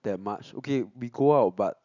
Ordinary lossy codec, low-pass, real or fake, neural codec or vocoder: none; 7.2 kHz; real; none